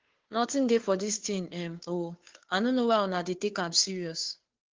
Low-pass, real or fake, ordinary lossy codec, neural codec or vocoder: 7.2 kHz; fake; Opus, 16 kbps; codec, 16 kHz, 2 kbps, FunCodec, trained on Chinese and English, 25 frames a second